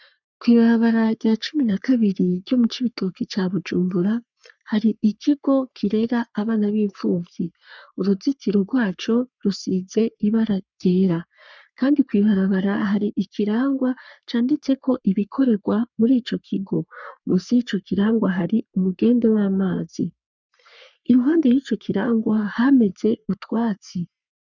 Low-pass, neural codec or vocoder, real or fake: 7.2 kHz; codec, 44.1 kHz, 3.4 kbps, Pupu-Codec; fake